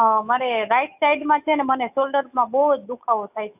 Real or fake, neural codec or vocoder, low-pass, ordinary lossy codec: fake; autoencoder, 48 kHz, 128 numbers a frame, DAC-VAE, trained on Japanese speech; 3.6 kHz; AAC, 32 kbps